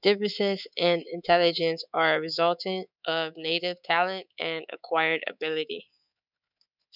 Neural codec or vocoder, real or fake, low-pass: codec, 24 kHz, 3.1 kbps, DualCodec; fake; 5.4 kHz